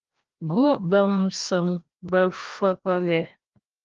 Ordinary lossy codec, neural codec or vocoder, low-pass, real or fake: Opus, 32 kbps; codec, 16 kHz, 1 kbps, FreqCodec, larger model; 7.2 kHz; fake